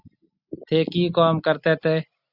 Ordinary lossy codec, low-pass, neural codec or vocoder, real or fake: Opus, 64 kbps; 5.4 kHz; none; real